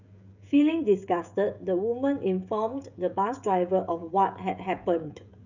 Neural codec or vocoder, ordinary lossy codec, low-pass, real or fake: codec, 16 kHz, 16 kbps, FreqCodec, smaller model; none; 7.2 kHz; fake